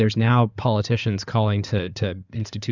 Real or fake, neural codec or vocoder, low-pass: fake; codec, 16 kHz in and 24 kHz out, 2.2 kbps, FireRedTTS-2 codec; 7.2 kHz